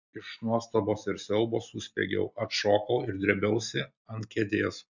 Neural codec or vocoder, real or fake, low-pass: none; real; 7.2 kHz